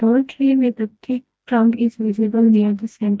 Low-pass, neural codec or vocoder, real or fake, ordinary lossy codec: none; codec, 16 kHz, 1 kbps, FreqCodec, smaller model; fake; none